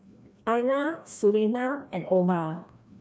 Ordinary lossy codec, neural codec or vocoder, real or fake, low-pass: none; codec, 16 kHz, 1 kbps, FreqCodec, larger model; fake; none